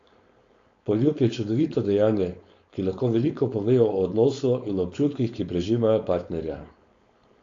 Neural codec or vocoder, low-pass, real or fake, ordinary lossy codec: codec, 16 kHz, 4.8 kbps, FACodec; 7.2 kHz; fake; AAC, 48 kbps